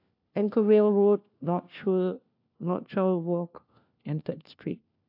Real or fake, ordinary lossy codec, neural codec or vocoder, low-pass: fake; AAC, 48 kbps; codec, 16 kHz, 1 kbps, FunCodec, trained on LibriTTS, 50 frames a second; 5.4 kHz